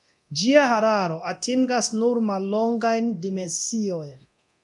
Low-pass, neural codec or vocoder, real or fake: 10.8 kHz; codec, 24 kHz, 0.9 kbps, DualCodec; fake